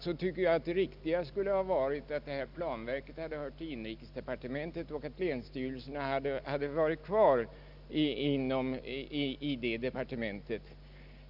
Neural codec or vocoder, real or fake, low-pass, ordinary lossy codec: none; real; 5.4 kHz; none